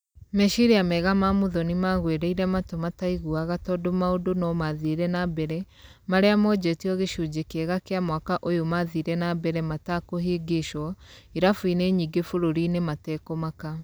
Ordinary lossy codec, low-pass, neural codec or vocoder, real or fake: none; none; none; real